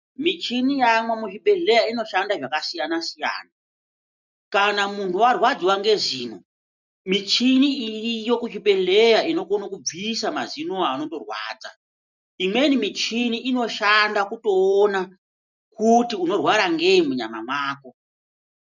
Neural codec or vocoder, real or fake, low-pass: none; real; 7.2 kHz